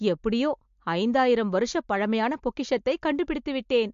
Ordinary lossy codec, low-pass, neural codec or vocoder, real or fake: MP3, 48 kbps; 7.2 kHz; none; real